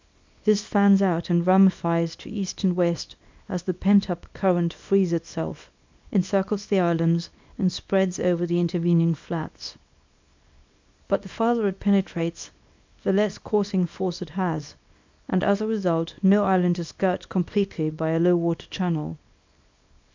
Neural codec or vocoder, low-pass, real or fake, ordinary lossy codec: codec, 24 kHz, 0.9 kbps, WavTokenizer, small release; 7.2 kHz; fake; MP3, 64 kbps